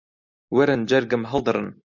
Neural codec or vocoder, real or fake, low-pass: none; real; 7.2 kHz